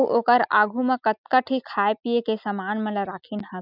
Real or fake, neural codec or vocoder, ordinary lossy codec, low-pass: real; none; none; 5.4 kHz